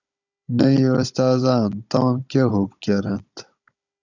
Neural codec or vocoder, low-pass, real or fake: codec, 16 kHz, 16 kbps, FunCodec, trained on Chinese and English, 50 frames a second; 7.2 kHz; fake